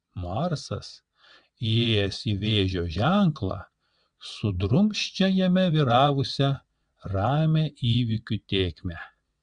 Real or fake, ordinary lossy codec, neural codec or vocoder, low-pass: fake; Opus, 64 kbps; vocoder, 22.05 kHz, 80 mel bands, WaveNeXt; 9.9 kHz